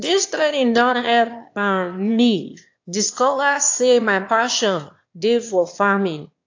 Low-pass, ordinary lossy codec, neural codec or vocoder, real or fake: 7.2 kHz; AAC, 48 kbps; autoencoder, 22.05 kHz, a latent of 192 numbers a frame, VITS, trained on one speaker; fake